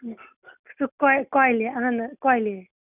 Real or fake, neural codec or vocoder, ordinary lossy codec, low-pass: real; none; none; 3.6 kHz